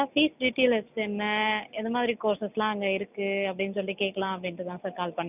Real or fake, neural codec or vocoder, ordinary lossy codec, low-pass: real; none; none; 3.6 kHz